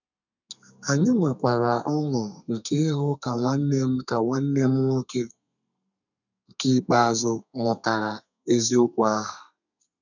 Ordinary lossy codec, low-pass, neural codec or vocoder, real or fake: none; 7.2 kHz; codec, 32 kHz, 1.9 kbps, SNAC; fake